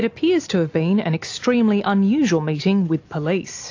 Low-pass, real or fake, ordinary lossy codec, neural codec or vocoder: 7.2 kHz; real; AAC, 48 kbps; none